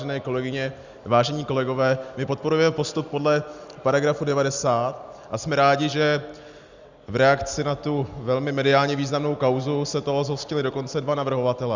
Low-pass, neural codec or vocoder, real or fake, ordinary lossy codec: 7.2 kHz; none; real; Opus, 64 kbps